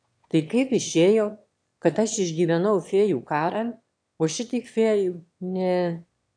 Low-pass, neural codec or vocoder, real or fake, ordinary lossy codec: 9.9 kHz; autoencoder, 22.05 kHz, a latent of 192 numbers a frame, VITS, trained on one speaker; fake; AAC, 64 kbps